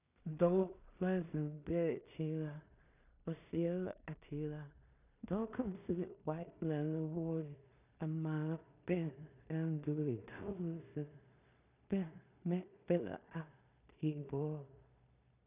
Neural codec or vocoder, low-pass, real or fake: codec, 16 kHz in and 24 kHz out, 0.4 kbps, LongCat-Audio-Codec, two codebook decoder; 3.6 kHz; fake